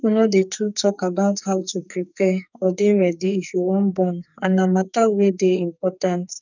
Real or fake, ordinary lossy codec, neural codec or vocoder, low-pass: fake; none; codec, 44.1 kHz, 2.6 kbps, SNAC; 7.2 kHz